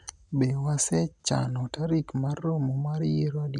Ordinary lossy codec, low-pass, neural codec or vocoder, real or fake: none; 10.8 kHz; none; real